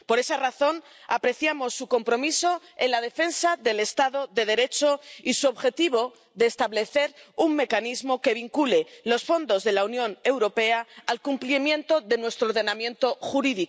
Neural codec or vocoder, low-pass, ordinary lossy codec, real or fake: none; none; none; real